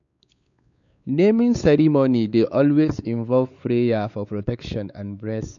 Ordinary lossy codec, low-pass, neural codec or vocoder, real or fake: none; 7.2 kHz; codec, 16 kHz, 4 kbps, X-Codec, WavLM features, trained on Multilingual LibriSpeech; fake